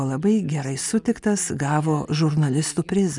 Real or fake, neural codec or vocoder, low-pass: real; none; 10.8 kHz